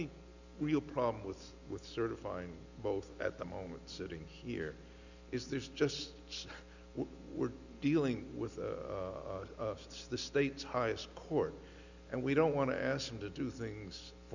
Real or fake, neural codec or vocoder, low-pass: real; none; 7.2 kHz